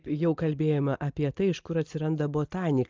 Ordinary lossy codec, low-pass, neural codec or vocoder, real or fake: Opus, 24 kbps; 7.2 kHz; none; real